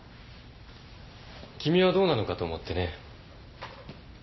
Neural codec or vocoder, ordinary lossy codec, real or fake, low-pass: none; MP3, 24 kbps; real; 7.2 kHz